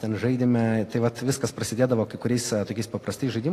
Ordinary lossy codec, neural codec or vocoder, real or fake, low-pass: AAC, 48 kbps; none; real; 14.4 kHz